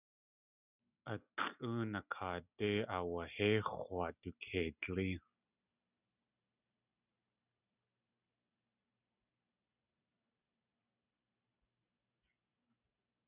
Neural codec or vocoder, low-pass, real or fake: none; 3.6 kHz; real